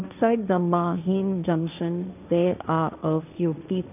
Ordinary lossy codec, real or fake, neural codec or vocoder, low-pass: none; fake; codec, 16 kHz, 1.1 kbps, Voila-Tokenizer; 3.6 kHz